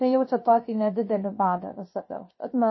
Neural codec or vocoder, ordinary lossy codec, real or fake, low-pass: codec, 16 kHz, 0.7 kbps, FocalCodec; MP3, 24 kbps; fake; 7.2 kHz